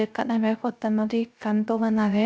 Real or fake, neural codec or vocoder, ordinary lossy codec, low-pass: fake; codec, 16 kHz, 0.3 kbps, FocalCodec; none; none